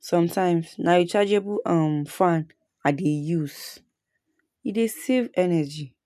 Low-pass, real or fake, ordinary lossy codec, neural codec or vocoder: 14.4 kHz; real; none; none